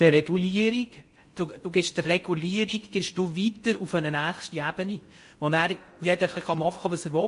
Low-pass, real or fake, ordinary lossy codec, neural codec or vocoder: 10.8 kHz; fake; MP3, 48 kbps; codec, 16 kHz in and 24 kHz out, 0.6 kbps, FocalCodec, streaming, 4096 codes